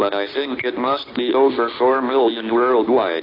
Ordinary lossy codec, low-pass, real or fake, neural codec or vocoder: AAC, 24 kbps; 5.4 kHz; real; none